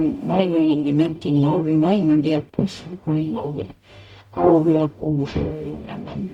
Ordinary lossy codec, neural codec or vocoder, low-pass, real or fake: Opus, 64 kbps; codec, 44.1 kHz, 0.9 kbps, DAC; 19.8 kHz; fake